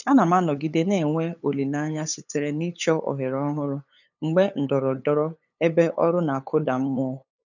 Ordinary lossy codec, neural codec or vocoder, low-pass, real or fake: none; codec, 16 kHz, 8 kbps, FunCodec, trained on LibriTTS, 25 frames a second; 7.2 kHz; fake